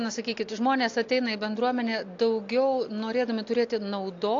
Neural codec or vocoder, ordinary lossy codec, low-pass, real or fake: none; AAC, 48 kbps; 7.2 kHz; real